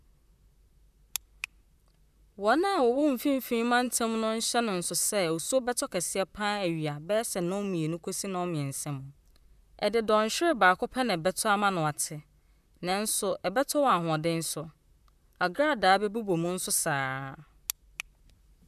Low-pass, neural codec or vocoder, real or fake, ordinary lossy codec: 14.4 kHz; vocoder, 44.1 kHz, 128 mel bands, Pupu-Vocoder; fake; none